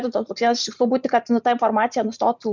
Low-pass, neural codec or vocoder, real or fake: 7.2 kHz; none; real